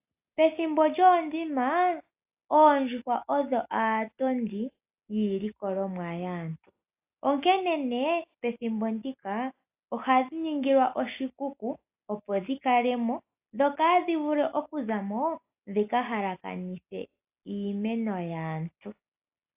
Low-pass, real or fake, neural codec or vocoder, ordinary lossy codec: 3.6 kHz; real; none; AAC, 32 kbps